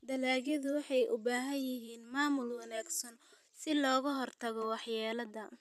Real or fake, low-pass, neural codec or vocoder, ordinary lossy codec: fake; 14.4 kHz; vocoder, 44.1 kHz, 128 mel bands every 512 samples, BigVGAN v2; none